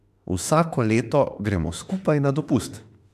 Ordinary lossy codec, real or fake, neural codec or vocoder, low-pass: AAC, 96 kbps; fake; autoencoder, 48 kHz, 32 numbers a frame, DAC-VAE, trained on Japanese speech; 14.4 kHz